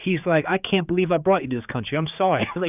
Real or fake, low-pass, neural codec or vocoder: fake; 3.6 kHz; codec, 16 kHz, 4 kbps, X-Codec, HuBERT features, trained on general audio